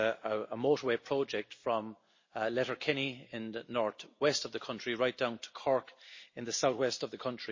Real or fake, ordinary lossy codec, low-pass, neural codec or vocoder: real; MP3, 32 kbps; 7.2 kHz; none